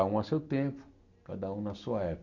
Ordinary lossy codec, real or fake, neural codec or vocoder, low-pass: none; real; none; 7.2 kHz